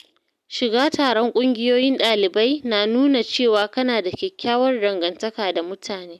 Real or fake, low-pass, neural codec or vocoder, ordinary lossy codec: real; 14.4 kHz; none; none